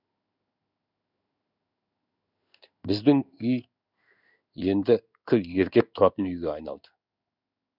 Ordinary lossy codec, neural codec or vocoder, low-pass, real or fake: none; codec, 16 kHz in and 24 kHz out, 1 kbps, XY-Tokenizer; 5.4 kHz; fake